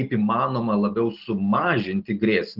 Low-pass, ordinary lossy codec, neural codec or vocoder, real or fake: 5.4 kHz; Opus, 16 kbps; none; real